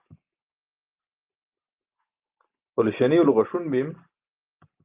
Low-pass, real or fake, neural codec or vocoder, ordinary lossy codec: 3.6 kHz; real; none; Opus, 24 kbps